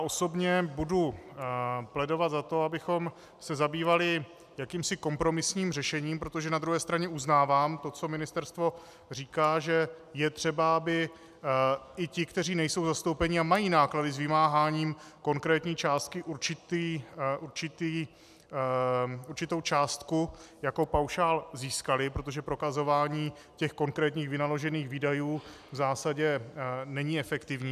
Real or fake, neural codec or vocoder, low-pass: real; none; 14.4 kHz